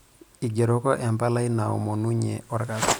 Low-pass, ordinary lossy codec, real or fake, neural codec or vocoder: none; none; real; none